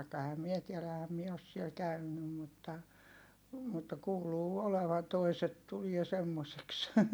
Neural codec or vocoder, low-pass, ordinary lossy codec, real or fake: none; none; none; real